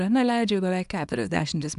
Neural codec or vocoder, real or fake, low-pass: codec, 24 kHz, 0.9 kbps, WavTokenizer, small release; fake; 10.8 kHz